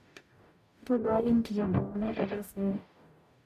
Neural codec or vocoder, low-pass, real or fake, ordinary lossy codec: codec, 44.1 kHz, 0.9 kbps, DAC; 14.4 kHz; fake; none